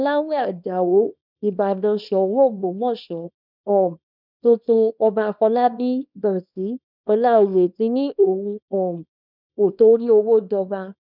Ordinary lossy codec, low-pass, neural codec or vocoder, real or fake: none; 5.4 kHz; codec, 24 kHz, 0.9 kbps, WavTokenizer, small release; fake